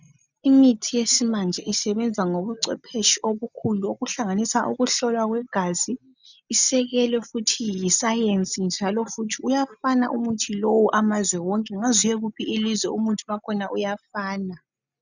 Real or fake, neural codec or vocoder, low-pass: real; none; 7.2 kHz